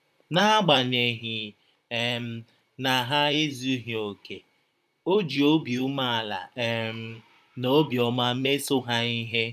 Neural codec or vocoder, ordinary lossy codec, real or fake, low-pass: vocoder, 44.1 kHz, 128 mel bands, Pupu-Vocoder; none; fake; 14.4 kHz